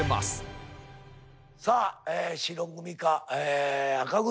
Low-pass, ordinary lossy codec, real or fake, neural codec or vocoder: none; none; real; none